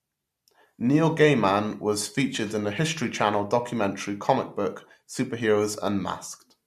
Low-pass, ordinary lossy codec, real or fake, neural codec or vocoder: 14.4 kHz; MP3, 64 kbps; real; none